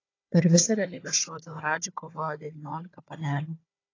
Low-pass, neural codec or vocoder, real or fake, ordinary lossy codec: 7.2 kHz; codec, 16 kHz, 4 kbps, FunCodec, trained on Chinese and English, 50 frames a second; fake; AAC, 32 kbps